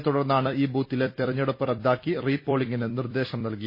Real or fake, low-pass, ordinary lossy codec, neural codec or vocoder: fake; 5.4 kHz; MP3, 24 kbps; vocoder, 44.1 kHz, 128 mel bands every 256 samples, BigVGAN v2